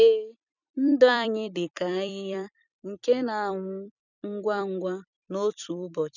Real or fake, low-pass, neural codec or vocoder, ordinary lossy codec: fake; 7.2 kHz; vocoder, 44.1 kHz, 128 mel bands every 256 samples, BigVGAN v2; none